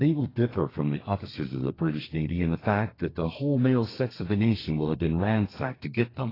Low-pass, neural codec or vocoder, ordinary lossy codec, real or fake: 5.4 kHz; codec, 32 kHz, 1.9 kbps, SNAC; AAC, 24 kbps; fake